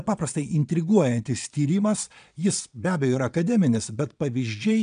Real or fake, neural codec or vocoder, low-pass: fake; vocoder, 22.05 kHz, 80 mel bands, Vocos; 9.9 kHz